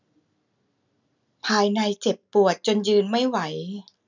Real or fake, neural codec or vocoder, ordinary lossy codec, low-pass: real; none; none; 7.2 kHz